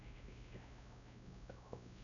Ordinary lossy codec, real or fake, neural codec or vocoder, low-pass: none; fake; codec, 16 kHz, 0.5 kbps, X-Codec, WavLM features, trained on Multilingual LibriSpeech; 7.2 kHz